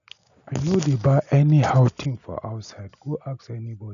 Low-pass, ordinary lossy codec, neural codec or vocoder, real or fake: 7.2 kHz; none; none; real